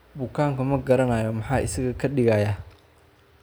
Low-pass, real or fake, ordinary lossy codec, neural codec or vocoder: none; real; none; none